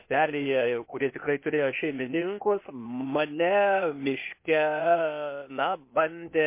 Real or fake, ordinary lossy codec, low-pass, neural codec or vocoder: fake; MP3, 24 kbps; 3.6 kHz; codec, 16 kHz, 0.8 kbps, ZipCodec